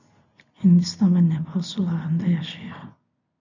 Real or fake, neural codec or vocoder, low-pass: real; none; 7.2 kHz